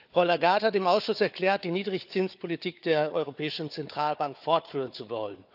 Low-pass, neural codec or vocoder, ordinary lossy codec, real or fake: 5.4 kHz; codec, 16 kHz, 4 kbps, FunCodec, trained on Chinese and English, 50 frames a second; none; fake